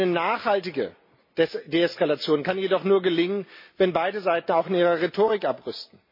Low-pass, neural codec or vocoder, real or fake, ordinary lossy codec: 5.4 kHz; none; real; MP3, 24 kbps